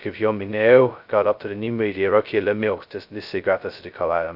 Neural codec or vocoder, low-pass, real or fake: codec, 16 kHz, 0.2 kbps, FocalCodec; 5.4 kHz; fake